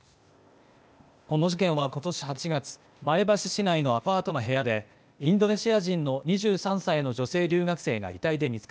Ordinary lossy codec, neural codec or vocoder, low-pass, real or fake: none; codec, 16 kHz, 0.8 kbps, ZipCodec; none; fake